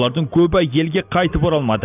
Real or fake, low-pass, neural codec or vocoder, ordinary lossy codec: real; 3.6 kHz; none; none